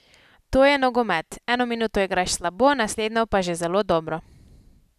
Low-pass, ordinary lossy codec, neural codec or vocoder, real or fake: 14.4 kHz; none; none; real